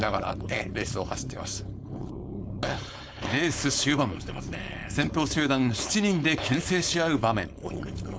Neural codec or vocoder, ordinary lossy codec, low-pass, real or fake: codec, 16 kHz, 4.8 kbps, FACodec; none; none; fake